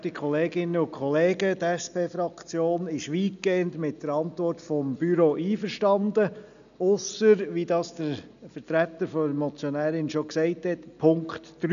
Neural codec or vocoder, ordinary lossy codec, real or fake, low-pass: none; none; real; 7.2 kHz